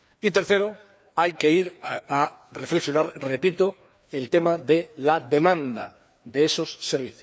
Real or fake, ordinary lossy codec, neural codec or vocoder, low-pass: fake; none; codec, 16 kHz, 2 kbps, FreqCodec, larger model; none